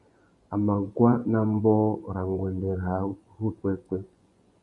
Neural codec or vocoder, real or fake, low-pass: vocoder, 24 kHz, 100 mel bands, Vocos; fake; 10.8 kHz